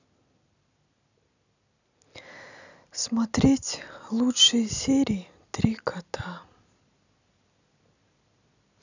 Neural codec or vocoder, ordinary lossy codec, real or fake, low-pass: none; none; real; 7.2 kHz